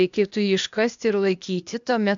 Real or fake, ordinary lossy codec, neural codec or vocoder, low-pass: fake; MP3, 64 kbps; codec, 16 kHz, 0.8 kbps, ZipCodec; 7.2 kHz